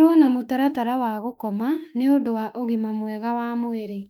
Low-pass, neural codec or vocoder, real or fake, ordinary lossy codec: 19.8 kHz; autoencoder, 48 kHz, 32 numbers a frame, DAC-VAE, trained on Japanese speech; fake; none